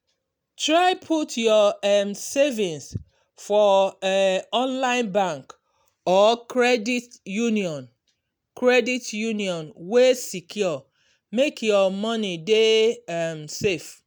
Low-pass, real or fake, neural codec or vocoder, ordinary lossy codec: none; real; none; none